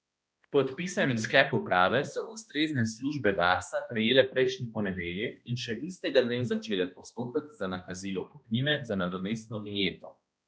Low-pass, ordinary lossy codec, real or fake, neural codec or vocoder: none; none; fake; codec, 16 kHz, 1 kbps, X-Codec, HuBERT features, trained on balanced general audio